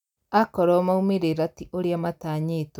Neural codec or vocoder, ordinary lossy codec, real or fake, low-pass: none; none; real; 19.8 kHz